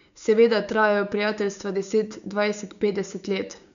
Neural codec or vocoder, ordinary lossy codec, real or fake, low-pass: codec, 16 kHz, 6 kbps, DAC; none; fake; 7.2 kHz